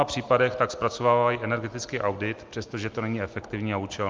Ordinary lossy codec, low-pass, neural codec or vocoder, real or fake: Opus, 32 kbps; 7.2 kHz; none; real